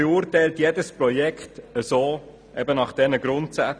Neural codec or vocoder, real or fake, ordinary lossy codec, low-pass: none; real; none; none